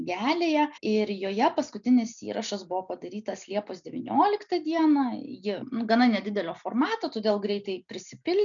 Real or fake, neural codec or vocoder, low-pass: real; none; 7.2 kHz